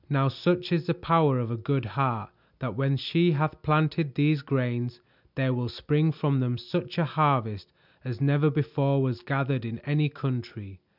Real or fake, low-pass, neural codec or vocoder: real; 5.4 kHz; none